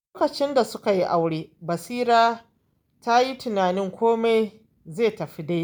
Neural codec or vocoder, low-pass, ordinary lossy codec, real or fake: none; none; none; real